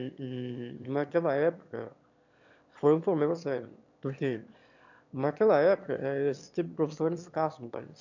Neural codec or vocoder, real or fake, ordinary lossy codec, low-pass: autoencoder, 22.05 kHz, a latent of 192 numbers a frame, VITS, trained on one speaker; fake; none; 7.2 kHz